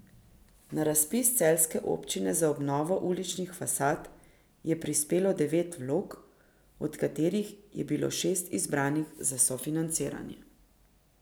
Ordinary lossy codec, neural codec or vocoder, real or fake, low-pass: none; none; real; none